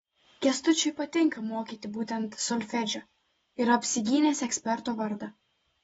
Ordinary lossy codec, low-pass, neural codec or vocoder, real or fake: AAC, 24 kbps; 19.8 kHz; none; real